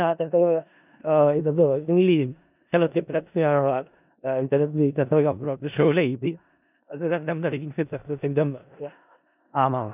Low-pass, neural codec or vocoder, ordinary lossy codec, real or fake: 3.6 kHz; codec, 16 kHz in and 24 kHz out, 0.4 kbps, LongCat-Audio-Codec, four codebook decoder; none; fake